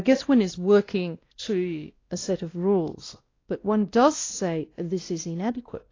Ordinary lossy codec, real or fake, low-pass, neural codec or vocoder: AAC, 32 kbps; fake; 7.2 kHz; codec, 16 kHz, 1 kbps, X-Codec, WavLM features, trained on Multilingual LibriSpeech